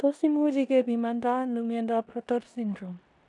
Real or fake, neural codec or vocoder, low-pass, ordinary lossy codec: fake; codec, 16 kHz in and 24 kHz out, 0.9 kbps, LongCat-Audio-Codec, four codebook decoder; 10.8 kHz; none